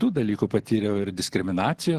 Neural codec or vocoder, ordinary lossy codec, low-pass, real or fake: none; Opus, 16 kbps; 14.4 kHz; real